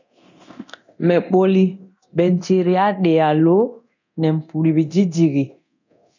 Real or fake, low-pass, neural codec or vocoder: fake; 7.2 kHz; codec, 24 kHz, 0.9 kbps, DualCodec